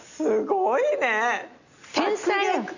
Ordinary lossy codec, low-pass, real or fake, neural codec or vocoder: none; 7.2 kHz; real; none